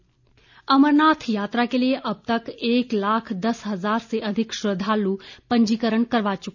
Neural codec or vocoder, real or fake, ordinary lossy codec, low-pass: none; real; none; 7.2 kHz